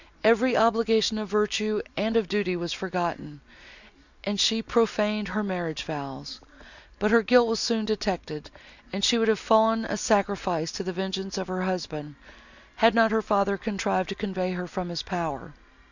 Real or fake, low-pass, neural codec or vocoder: real; 7.2 kHz; none